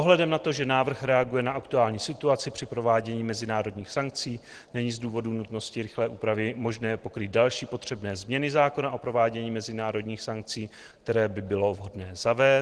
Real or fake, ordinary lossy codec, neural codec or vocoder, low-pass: real; Opus, 16 kbps; none; 10.8 kHz